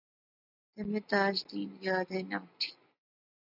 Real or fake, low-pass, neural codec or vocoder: real; 5.4 kHz; none